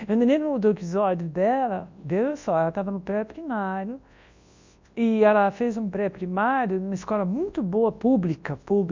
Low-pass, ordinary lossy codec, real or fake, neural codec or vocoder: 7.2 kHz; MP3, 64 kbps; fake; codec, 24 kHz, 0.9 kbps, WavTokenizer, large speech release